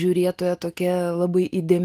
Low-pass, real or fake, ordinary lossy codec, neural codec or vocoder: 14.4 kHz; real; Opus, 24 kbps; none